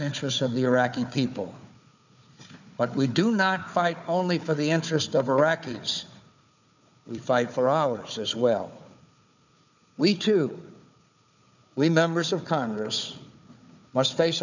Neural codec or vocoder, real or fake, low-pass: codec, 16 kHz, 4 kbps, FunCodec, trained on Chinese and English, 50 frames a second; fake; 7.2 kHz